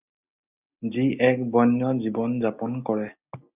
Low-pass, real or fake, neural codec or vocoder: 3.6 kHz; real; none